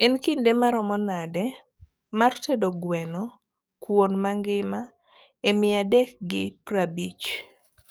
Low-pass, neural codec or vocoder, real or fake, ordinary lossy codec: none; codec, 44.1 kHz, 7.8 kbps, DAC; fake; none